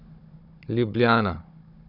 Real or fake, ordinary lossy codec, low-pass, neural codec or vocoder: fake; none; 5.4 kHz; codec, 16 kHz, 16 kbps, FunCodec, trained on Chinese and English, 50 frames a second